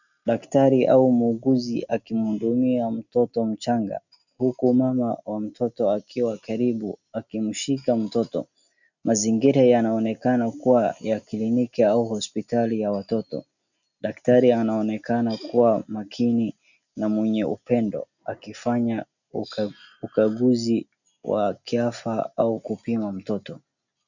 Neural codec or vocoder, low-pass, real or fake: none; 7.2 kHz; real